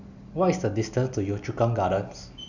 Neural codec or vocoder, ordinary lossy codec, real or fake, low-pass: none; none; real; 7.2 kHz